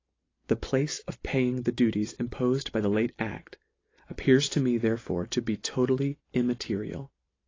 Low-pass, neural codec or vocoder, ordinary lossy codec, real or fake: 7.2 kHz; none; AAC, 32 kbps; real